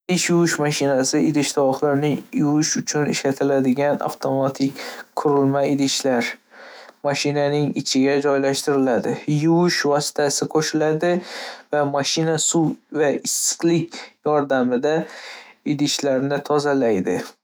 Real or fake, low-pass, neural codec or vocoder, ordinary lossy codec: fake; none; autoencoder, 48 kHz, 128 numbers a frame, DAC-VAE, trained on Japanese speech; none